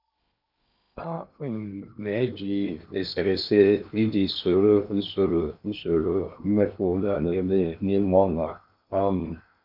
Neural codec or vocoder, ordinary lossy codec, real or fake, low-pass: codec, 16 kHz in and 24 kHz out, 0.8 kbps, FocalCodec, streaming, 65536 codes; none; fake; 5.4 kHz